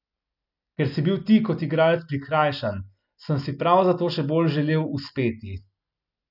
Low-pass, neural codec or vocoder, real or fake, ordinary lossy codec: 5.4 kHz; none; real; none